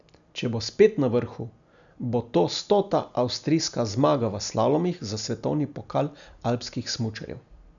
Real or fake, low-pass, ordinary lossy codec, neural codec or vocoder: real; 7.2 kHz; none; none